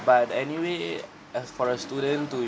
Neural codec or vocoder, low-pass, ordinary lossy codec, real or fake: none; none; none; real